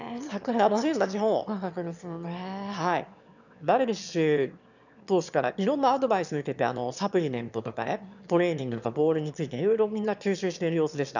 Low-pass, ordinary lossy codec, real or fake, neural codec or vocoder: 7.2 kHz; none; fake; autoencoder, 22.05 kHz, a latent of 192 numbers a frame, VITS, trained on one speaker